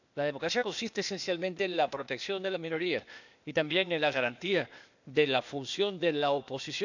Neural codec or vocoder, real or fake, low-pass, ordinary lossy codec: codec, 16 kHz, 0.8 kbps, ZipCodec; fake; 7.2 kHz; none